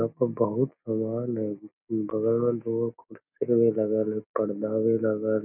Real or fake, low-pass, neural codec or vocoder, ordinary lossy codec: real; 3.6 kHz; none; none